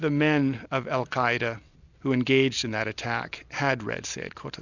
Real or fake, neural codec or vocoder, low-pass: real; none; 7.2 kHz